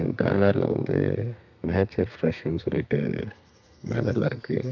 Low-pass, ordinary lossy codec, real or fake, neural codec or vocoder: 7.2 kHz; none; fake; codec, 32 kHz, 1.9 kbps, SNAC